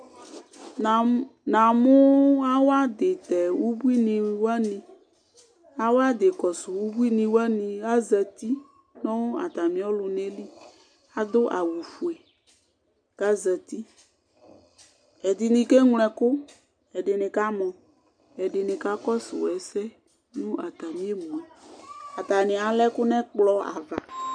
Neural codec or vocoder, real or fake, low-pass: none; real; 9.9 kHz